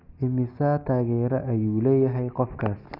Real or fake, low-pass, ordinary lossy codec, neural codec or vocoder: real; 5.4 kHz; Opus, 24 kbps; none